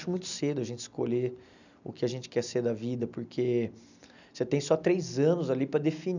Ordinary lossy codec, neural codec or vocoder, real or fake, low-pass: none; none; real; 7.2 kHz